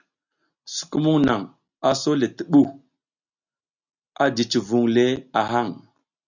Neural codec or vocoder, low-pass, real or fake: none; 7.2 kHz; real